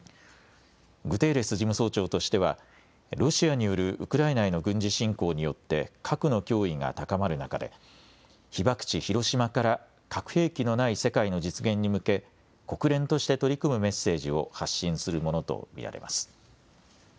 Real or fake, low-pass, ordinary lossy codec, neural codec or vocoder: real; none; none; none